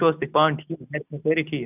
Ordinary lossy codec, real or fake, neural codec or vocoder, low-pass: none; real; none; 3.6 kHz